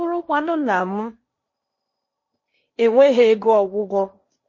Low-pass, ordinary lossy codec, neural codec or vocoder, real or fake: 7.2 kHz; MP3, 32 kbps; codec, 16 kHz in and 24 kHz out, 0.8 kbps, FocalCodec, streaming, 65536 codes; fake